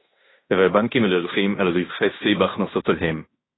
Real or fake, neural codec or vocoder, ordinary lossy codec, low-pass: fake; codec, 16 kHz in and 24 kHz out, 0.9 kbps, LongCat-Audio-Codec, fine tuned four codebook decoder; AAC, 16 kbps; 7.2 kHz